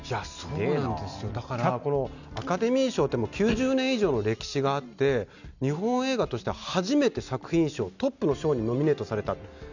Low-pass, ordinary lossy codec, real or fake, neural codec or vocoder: 7.2 kHz; none; real; none